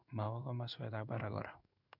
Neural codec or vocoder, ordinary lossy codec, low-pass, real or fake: codec, 16 kHz in and 24 kHz out, 1 kbps, XY-Tokenizer; none; 5.4 kHz; fake